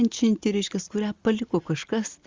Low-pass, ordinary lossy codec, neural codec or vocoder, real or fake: 7.2 kHz; Opus, 32 kbps; none; real